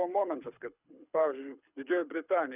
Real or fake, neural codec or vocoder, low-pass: fake; codec, 24 kHz, 6 kbps, HILCodec; 3.6 kHz